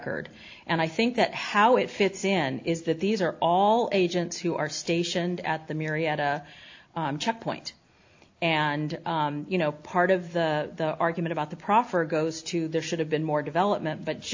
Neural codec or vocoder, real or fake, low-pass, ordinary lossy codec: none; real; 7.2 kHz; AAC, 48 kbps